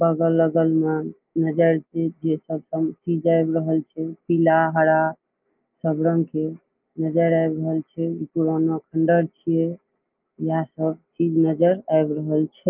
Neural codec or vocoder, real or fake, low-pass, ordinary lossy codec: none; real; 3.6 kHz; Opus, 24 kbps